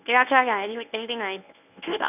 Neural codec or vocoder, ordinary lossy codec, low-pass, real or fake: codec, 24 kHz, 0.9 kbps, WavTokenizer, medium speech release version 1; none; 3.6 kHz; fake